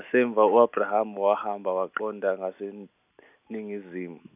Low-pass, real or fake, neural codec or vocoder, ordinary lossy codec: 3.6 kHz; real; none; none